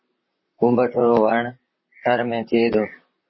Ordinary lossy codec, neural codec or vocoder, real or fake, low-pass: MP3, 24 kbps; vocoder, 44.1 kHz, 128 mel bands, Pupu-Vocoder; fake; 7.2 kHz